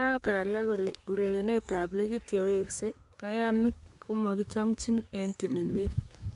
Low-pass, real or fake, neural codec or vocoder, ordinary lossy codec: 10.8 kHz; fake; codec, 24 kHz, 1 kbps, SNAC; none